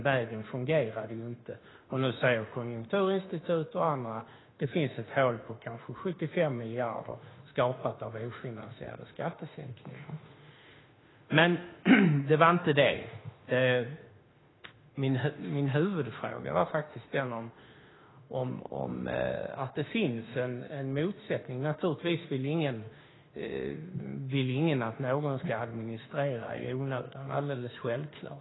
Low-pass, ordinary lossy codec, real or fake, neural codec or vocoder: 7.2 kHz; AAC, 16 kbps; fake; autoencoder, 48 kHz, 32 numbers a frame, DAC-VAE, trained on Japanese speech